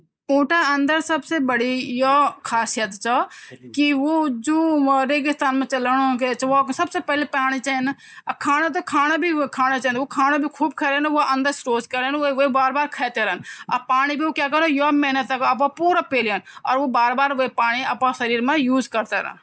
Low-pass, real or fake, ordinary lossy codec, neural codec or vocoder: none; real; none; none